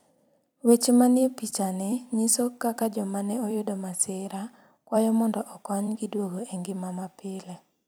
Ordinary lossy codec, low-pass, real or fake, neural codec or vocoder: none; none; fake; vocoder, 44.1 kHz, 128 mel bands every 256 samples, BigVGAN v2